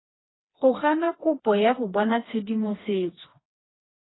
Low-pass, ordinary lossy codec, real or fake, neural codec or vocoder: 7.2 kHz; AAC, 16 kbps; fake; codec, 16 kHz, 2 kbps, FreqCodec, smaller model